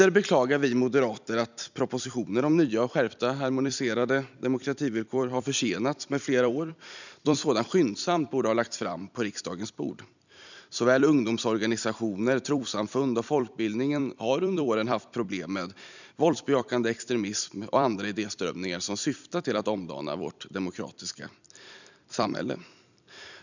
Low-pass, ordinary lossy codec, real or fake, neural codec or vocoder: 7.2 kHz; none; fake; vocoder, 44.1 kHz, 128 mel bands every 256 samples, BigVGAN v2